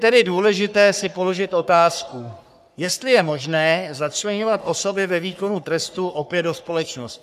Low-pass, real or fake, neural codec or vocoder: 14.4 kHz; fake; codec, 44.1 kHz, 3.4 kbps, Pupu-Codec